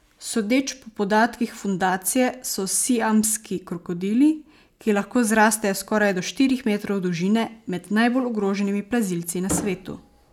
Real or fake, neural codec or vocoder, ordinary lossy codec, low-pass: real; none; none; 19.8 kHz